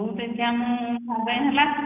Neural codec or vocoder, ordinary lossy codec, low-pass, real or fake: none; none; 3.6 kHz; real